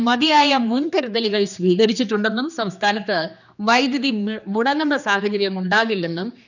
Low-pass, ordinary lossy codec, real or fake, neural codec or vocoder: 7.2 kHz; none; fake; codec, 16 kHz, 2 kbps, X-Codec, HuBERT features, trained on general audio